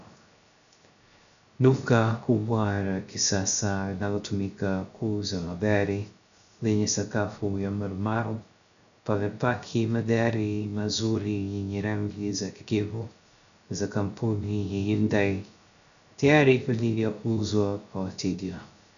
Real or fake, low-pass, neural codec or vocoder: fake; 7.2 kHz; codec, 16 kHz, 0.3 kbps, FocalCodec